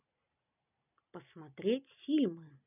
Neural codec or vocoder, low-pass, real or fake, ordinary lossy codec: none; 3.6 kHz; real; none